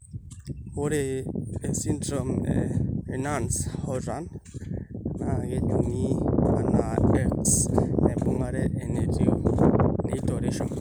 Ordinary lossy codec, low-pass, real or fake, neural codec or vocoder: none; none; real; none